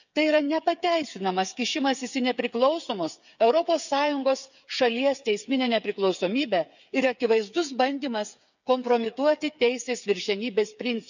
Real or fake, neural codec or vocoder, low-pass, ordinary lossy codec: fake; codec, 16 kHz, 8 kbps, FreqCodec, smaller model; 7.2 kHz; none